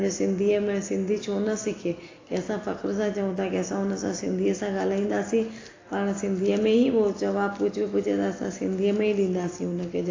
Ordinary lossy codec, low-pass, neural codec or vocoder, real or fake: AAC, 32 kbps; 7.2 kHz; none; real